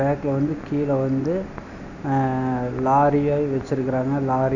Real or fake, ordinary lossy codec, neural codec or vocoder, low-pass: real; none; none; 7.2 kHz